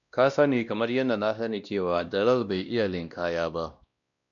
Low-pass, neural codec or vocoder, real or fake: 7.2 kHz; codec, 16 kHz, 1 kbps, X-Codec, WavLM features, trained on Multilingual LibriSpeech; fake